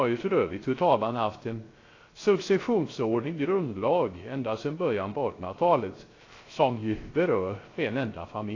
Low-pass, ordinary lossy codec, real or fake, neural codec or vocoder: 7.2 kHz; AAC, 32 kbps; fake; codec, 16 kHz, 0.3 kbps, FocalCodec